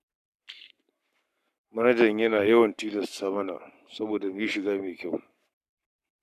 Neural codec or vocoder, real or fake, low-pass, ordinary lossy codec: codec, 44.1 kHz, 7.8 kbps, Pupu-Codec; fake; 14.4 kHz; none